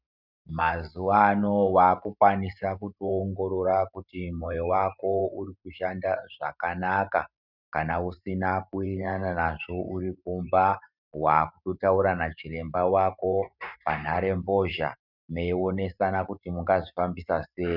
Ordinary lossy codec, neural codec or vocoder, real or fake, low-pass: Opus, 64 kbps; vocoder, 44.1 kHz, 128 mel bands every 512 samples, BigVGAN v2; fake; 5.4 kHz